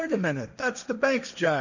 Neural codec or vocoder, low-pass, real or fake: codec, 16 kHz, 1.1 kbps, Voila-Tokenizer; 7.2 kHz; fake